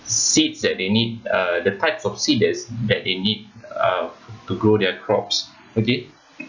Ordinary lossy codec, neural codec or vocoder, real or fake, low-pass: none; none; real; 7.2 kHz